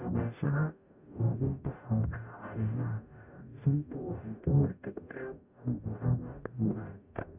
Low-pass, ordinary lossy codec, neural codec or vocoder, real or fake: 3.6 kHz; none; codec, 44.1 kHz, 0.9 kbps, DAC; fake